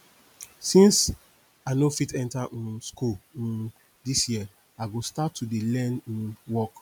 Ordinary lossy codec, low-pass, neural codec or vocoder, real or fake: none; none; none; real